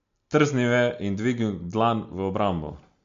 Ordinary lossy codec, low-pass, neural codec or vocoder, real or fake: MP3, 48 kbps; 7.2 kHz; none; real